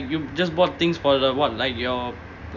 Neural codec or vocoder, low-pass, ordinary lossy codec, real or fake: none; 7.2 kHz; none; real